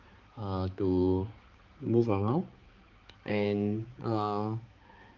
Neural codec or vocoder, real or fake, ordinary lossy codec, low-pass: codec, 16 kHz, 4 kbps, X-Codec, HuBERT features, trained on balanced general audio; fake; Opus, 24 kbps; 7.2 kHz